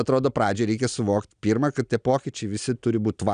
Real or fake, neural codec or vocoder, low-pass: real; none; 9.9 kHz